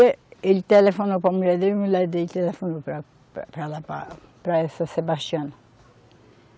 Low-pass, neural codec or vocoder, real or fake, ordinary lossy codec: none; none; real; none